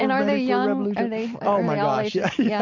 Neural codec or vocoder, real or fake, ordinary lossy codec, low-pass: none; real; MP3, 48 kbps; 7.2 kHz